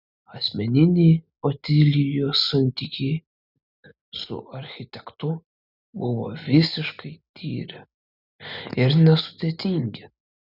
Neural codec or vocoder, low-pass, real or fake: none; 5.4 kHz; real